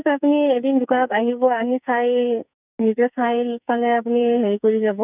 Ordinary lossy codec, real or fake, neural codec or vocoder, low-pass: none; fake; codec, 44.1 kHz, 2.6 kbps, SNAC; 3.6 kHz